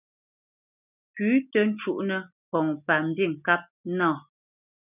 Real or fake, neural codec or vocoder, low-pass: real; none; 3.6 kHz